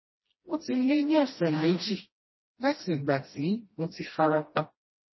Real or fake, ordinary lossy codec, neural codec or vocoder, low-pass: fake; MP3, 24 kbps; codec, 16 kHz, 1 kbps, FreqCodec, smaller model; 7.2 kHz